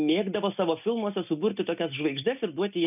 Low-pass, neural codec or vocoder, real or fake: 3.6 kHz; none; real